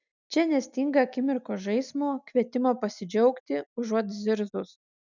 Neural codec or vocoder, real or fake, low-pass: none; real; 7.2 kHz